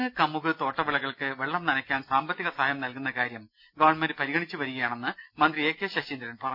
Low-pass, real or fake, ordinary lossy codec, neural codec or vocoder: 5.4 kHz; real; none; none